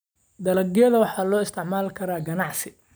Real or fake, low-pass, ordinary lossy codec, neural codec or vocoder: real; none; none; none